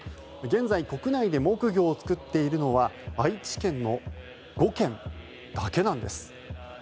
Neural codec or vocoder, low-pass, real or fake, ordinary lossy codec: none; none; real; none